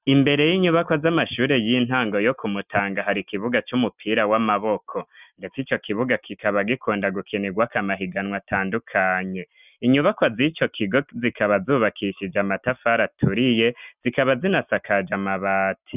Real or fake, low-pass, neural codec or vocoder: real; 3.6 kHz; none